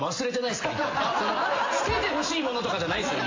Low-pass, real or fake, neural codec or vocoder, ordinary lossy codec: 7.2 kHz; real; none; AAC, 32 kbps